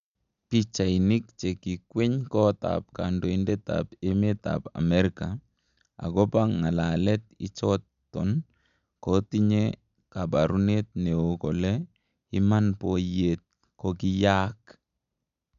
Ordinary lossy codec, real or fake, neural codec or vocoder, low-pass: MP3, 96 kbps; real; none; 7.2 kHz